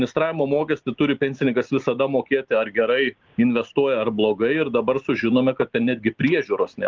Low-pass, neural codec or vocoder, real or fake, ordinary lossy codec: 7.2 kHz; none; real; Opus, 32 kbps